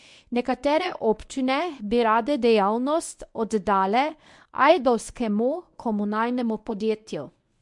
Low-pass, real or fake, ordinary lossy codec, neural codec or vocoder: 10.8 kHz; fake; MP3, 64 kbps; codec, 24 kHz, 0.9 kbps, WavTokenizer, medium speech release version 1